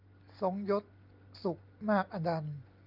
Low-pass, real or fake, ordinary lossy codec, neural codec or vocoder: 5.4 kHz; real; Opus, 24 kbps; none